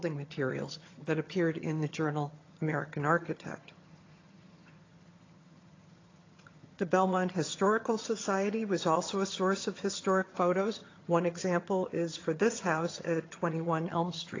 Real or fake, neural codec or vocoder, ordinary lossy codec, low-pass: fake; vocoder, 22.05 kHz, 80 mel bands, HiFi-GAN; AAC, 32 kbps; 7.2 kHz